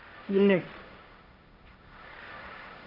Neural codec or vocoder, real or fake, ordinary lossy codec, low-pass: codec, 16 kHz, 1.1 kbps, Voila-Tokenizer; fake; none; 5.4 kHz